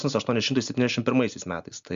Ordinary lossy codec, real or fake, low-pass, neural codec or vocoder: MP3, 64 kbps; real; 7.2 kHz; none